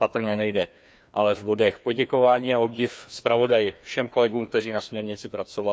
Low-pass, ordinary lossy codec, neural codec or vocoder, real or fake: none; none; codec, 16 kHz, 2 kbps, FreqCodec, larger model; fake